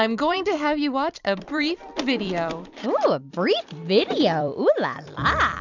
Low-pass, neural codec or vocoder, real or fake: 7.2 kHz; vocoder, 44.1 kHz, 128 mel bands every 256 samples, BigVGAN v2; fake